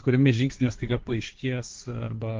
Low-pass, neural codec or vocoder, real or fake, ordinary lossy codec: 7.2 kHz; codec, 16 kHz, 1.1 kbps, Voila-Tokenizer; fake; Opus, 32 kbps